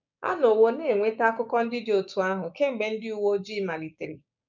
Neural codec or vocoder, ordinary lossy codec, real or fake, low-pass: codec, 16 kHz, 6 kbps, DAC; none; fake; 7.2 kHz